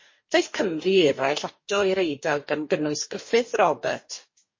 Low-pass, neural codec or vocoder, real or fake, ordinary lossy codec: 7.2 kHz; codec, 44.1 kHz, 2.6 kbps, DAC; fake; MP3, 32 kbps